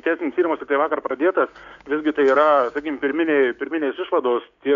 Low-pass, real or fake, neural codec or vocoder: 7.2 kHz; fake; codec, 16 kHz, 6 kbps, DAC